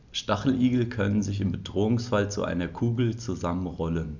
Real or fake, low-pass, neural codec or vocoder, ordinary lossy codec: real; 7.2 kHz; none; none